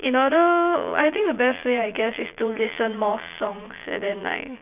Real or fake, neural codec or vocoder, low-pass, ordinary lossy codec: fake; vocoder, 22.05 kHz, 80 mel bands, Vocos; 3.6 kHz; none